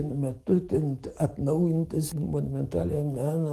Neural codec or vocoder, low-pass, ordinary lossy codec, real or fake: codec, 44.1 kHz, 7.8 kbps, DAC; 14.4 kHz; Opus, 24 kbps; fake